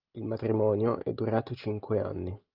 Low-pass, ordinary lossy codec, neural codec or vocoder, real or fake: 5.4 kHz; Opus, 32 kbps; none; real